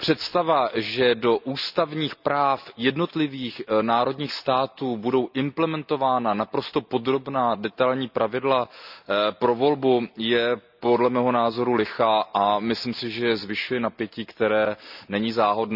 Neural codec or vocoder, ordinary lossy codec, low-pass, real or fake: none; none; 5.4 kHz; real